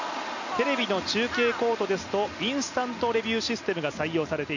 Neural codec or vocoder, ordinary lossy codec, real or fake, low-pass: none; none; real; 7.2 kHz